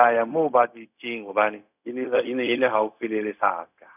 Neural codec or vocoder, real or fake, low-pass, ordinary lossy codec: codec, 16 kHz, 0.4 kbps, LongCat-Audio-Codec; fake; 3.6 kHz; none